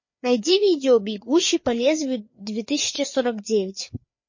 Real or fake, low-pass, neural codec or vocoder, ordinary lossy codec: fake; 7.2 kHz; codec, 16 kHz, 4 kbps, FreqCodec, larger model; MP3, 32 kbps